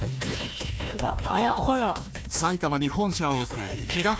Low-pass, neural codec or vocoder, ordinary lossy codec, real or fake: none; codec, 16 kHz, 1 kbps, FunCodec, trained on Chinese and English, 50 frames a second; none; fake